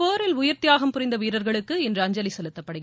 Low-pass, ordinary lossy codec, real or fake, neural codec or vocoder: none; none; real; none